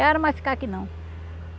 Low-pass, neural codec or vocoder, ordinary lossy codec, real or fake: none; none; none; real